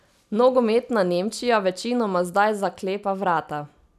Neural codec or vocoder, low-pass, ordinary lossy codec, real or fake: none; 14.4 kHz; none; real